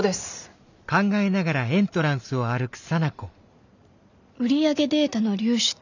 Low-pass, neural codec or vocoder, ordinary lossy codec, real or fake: 7.2 kHz; none; none; real